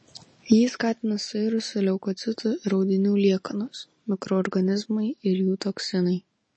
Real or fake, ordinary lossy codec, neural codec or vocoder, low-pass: real; MP3, 32 kbps; none; 9.9 kHz